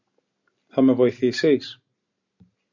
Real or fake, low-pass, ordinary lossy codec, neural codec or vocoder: real; 7.2 kHz; MP3, 64 kbps; none